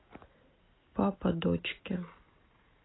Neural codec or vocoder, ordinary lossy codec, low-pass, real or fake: none; AAC, 16 kbps; 7.2 kHz; real